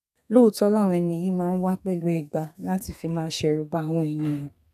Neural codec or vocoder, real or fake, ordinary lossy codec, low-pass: codec, 32 kHz, 1.9 kbps, SNAC; fake; none; 14.4 kHz